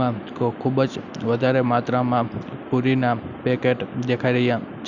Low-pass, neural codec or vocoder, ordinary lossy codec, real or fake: 7.2 kHz; none; none; real